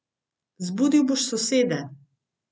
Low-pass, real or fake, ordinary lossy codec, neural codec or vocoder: none; real; none; none